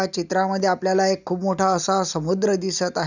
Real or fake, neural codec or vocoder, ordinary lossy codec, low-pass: real; none; none; 7.2 kHz